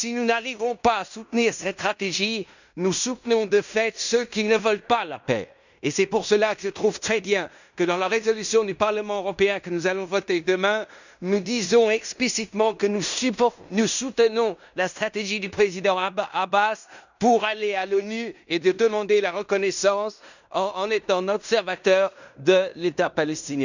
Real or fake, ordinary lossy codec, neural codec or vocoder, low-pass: fake; none; codec, 16 kHz in and 24 kHz out, 0.9 kbps, LongCat-Audio-Codec, fine tuned four codebook decoder; 7.2 kHz